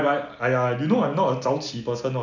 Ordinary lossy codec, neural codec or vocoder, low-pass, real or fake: none; none; 7.2 kHz; real